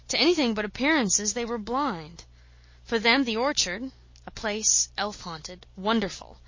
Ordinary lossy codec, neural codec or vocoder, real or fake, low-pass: MP3, 32 kbps; none; real; 7.2 kHz